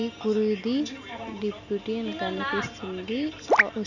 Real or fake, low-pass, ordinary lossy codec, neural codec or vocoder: real; 7.2 kHz; none; none